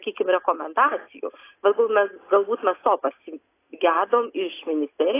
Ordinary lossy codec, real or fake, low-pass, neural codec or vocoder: AAC, 24 kbps; real; 3.6 kHz; none